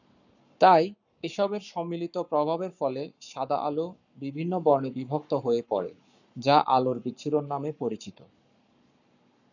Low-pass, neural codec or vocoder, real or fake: 7.2 kHz; codec, 44.1 kHz, 7.8 kbps, Pupu-Codec; fake